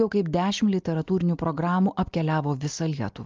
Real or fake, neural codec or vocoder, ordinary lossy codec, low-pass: real; none; Opus, 24 kbps; 7.2 kHz